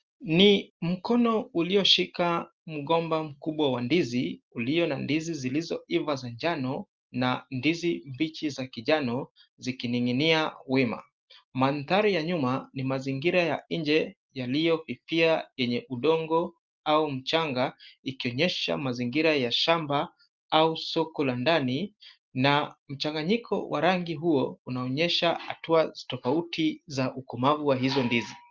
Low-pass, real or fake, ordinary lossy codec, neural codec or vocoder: 7.2 kHz; real; Opus, 32 kbps; none